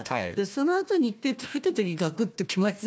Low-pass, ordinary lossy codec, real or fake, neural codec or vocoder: none; none; fake; codec, 16 kHz, 1 kbps, FunCodec, trained on Chinese and English, 50 frames a second